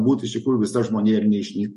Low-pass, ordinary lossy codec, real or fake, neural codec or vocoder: 14.4 kHz; MP3, 48 kbps; real; none